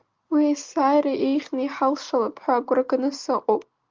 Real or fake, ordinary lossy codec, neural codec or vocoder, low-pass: real; Opus, 32 kbps; none; 7.2 kHz